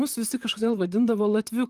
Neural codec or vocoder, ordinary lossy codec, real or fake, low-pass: vocoder, 44.1 kHz, 128 mel bands, Pupu-Vocoder; Opus, 24 kbps; fake; 14.4 kHz